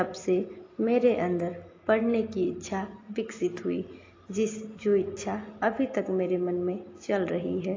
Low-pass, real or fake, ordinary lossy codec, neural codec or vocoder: 7.2 kHz; real; AAC, 32 kbps; none